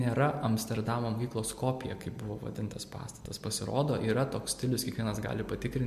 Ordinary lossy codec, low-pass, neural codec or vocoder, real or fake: MP3, 64 kbps; 14.4 kHz; none; real